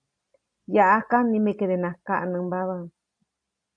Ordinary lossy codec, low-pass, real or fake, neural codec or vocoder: AAC, 48 kbps; 9.9 kHz; real; none